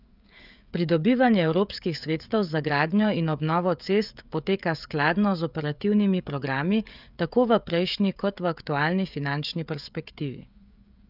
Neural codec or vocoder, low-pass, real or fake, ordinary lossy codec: codec, 16 kHz, 16 kbps, FreqCodec, smaller model; 5.4 kHz; fake; none